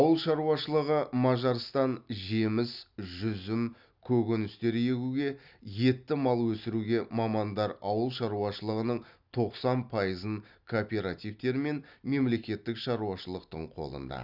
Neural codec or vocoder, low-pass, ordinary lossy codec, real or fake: none; 5.4 kHz; Opus, 64 kbps; real